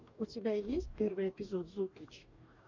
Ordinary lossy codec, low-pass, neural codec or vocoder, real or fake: MP3, 64 kbps; 7.2 kHz; codec, 44.1 kHz, 2.6 kbps, DAC; fake